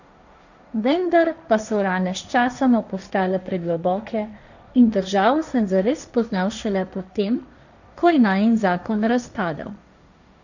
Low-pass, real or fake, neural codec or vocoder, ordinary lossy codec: 7.2 kHz; fake; codec, 16 kHz, 1.1 kbps, Voila-Tokenizer; none